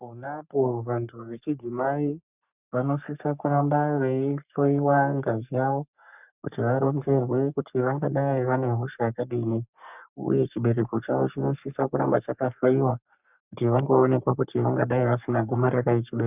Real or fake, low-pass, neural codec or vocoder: fake; 3.6 kHz; codec, 44.1 kHz, 3.4 kbps, Pupu-Codec